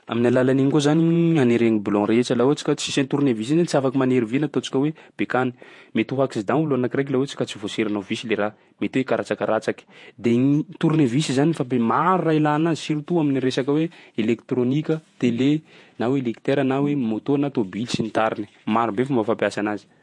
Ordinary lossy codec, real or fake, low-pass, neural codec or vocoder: MP3, 48 kbps; fake; 10.8 kHz; vocoder, 48 kHz, 128 mel bands, Vocos